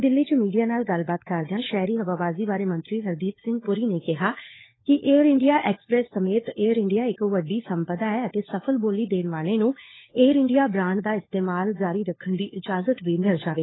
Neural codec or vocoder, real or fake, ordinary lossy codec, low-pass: codec, 16 kHz, 4 kbps, FunCodec, trained on LibriTTS, 50 frames a second; fake; AAC, 16 kbps; 7.2 kHz